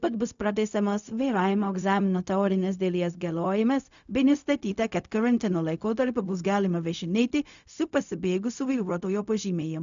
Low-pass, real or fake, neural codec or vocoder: 7.2 kHz; fake; codec, 16 kHz, 0.4 kbps, LongCat-Audio-Codec